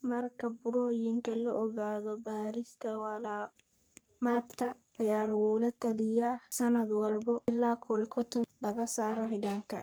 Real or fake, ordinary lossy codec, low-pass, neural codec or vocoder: fake; none; none; codec, 44.1 kHz, 3.4 kbps, Pupu-Codec